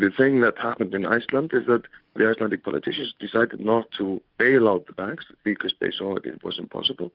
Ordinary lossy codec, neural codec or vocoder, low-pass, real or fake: Opus, 32 kbps; codec, 44.1 kHz, 7.8 kbps, DAC; 5.4 kHz; fake